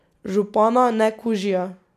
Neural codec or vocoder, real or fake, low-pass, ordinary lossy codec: none; real; 14.4 kHz; none